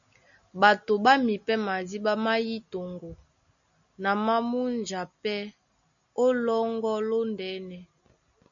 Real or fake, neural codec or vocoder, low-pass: real; none; 7.2 kHz